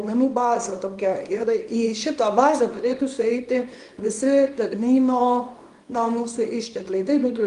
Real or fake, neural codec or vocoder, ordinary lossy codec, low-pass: fake; codec, 24 kHz, 0.9 kbps, WavTokenizer, small release; Opus, 32 kbps; 10.8 kHz